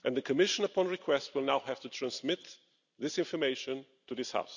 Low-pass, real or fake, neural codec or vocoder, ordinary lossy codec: 7.2 kHz; real; none; none